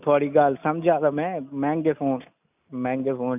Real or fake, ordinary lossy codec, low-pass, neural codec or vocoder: real; none; 3.6 kHz; none